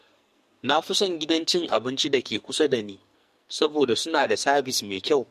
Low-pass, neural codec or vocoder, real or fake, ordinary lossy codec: 14.4 kHz; codec, 44.1 kHz, 2.6 kbps, SNAC; fake; MP3, 64 kbps